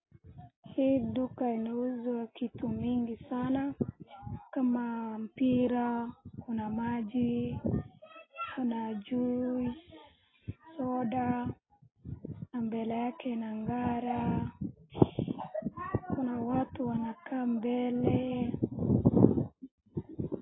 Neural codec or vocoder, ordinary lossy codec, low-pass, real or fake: none; AAC, 16 kbps; 7.2 kHz; real